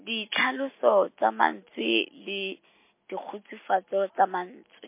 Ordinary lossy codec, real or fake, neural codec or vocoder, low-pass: MP3, 24 kbps; real; none; 3.6 kHz